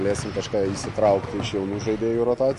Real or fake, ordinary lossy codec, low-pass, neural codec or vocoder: real; MP3, 48 kbps; 14.4 kHz; none